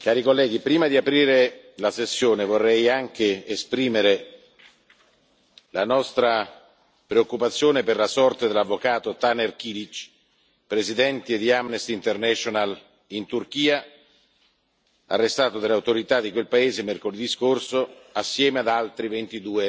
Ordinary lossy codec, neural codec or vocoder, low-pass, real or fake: none; none; none; real